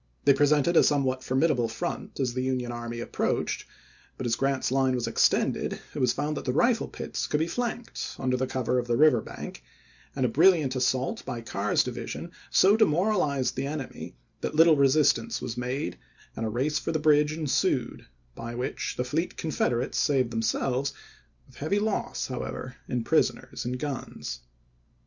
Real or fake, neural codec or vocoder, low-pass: real; none; 7.2 kHz